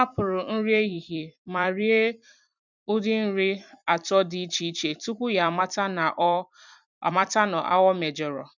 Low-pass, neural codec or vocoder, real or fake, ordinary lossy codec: 7.2 kHz; vocoder, 44.1 kHz, 128 mel bands every 256 samples, BigVGAN v2; fake; none